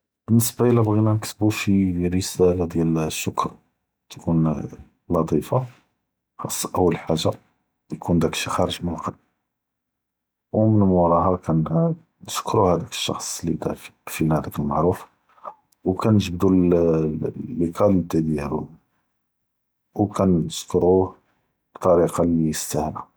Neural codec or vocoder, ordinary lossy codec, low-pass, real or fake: none; none; none; real